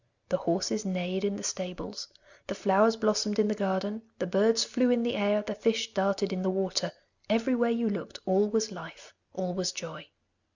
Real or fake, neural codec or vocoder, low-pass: real; none; 7.2 kHz